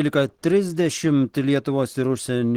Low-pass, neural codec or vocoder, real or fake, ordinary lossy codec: 19.8 kHz; none; real; Opus, 16 kbps